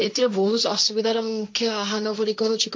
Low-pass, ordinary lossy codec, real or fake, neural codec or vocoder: none; none; fake; codec, 16 kHz, 1.1 kbps, Voila-Tokenizer